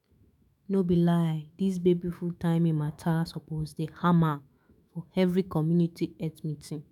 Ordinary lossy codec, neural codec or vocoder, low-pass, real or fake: none; autoencoder, 48 kHz, 128 numbers a frame, DAC-VAE, trained on Japanese speech; 19.8 kHz; fake